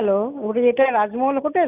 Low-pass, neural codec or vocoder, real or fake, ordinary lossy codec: 3.6 kHz; none; real; none